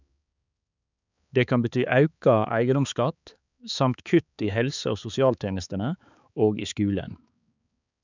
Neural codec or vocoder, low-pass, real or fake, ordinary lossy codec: codec, 16 kHz, 4 kbps, X-Codec, HuBERT features, trained on balanced general audio; 7.2 kHz; fake; none